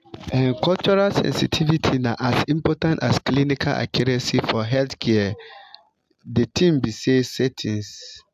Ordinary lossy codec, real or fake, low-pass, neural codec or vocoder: AAC, 96 kbps; real; 14.4 kHz; none